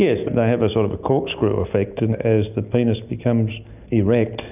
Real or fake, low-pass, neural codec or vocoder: real; 3.6 kHz; none